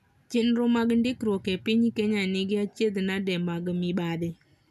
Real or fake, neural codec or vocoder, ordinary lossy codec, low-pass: real; none; none; 14.4 kHz